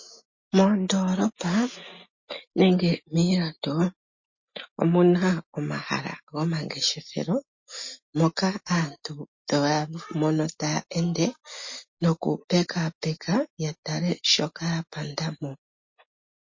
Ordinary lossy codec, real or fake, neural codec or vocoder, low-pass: MP3, 32 kbps; real; none; 7.2 kHz